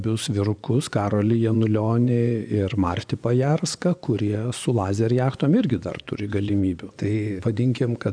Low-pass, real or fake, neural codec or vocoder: 9.9 kHz; fake; vocoder, 24 kHz, 100 mel bands, Vocos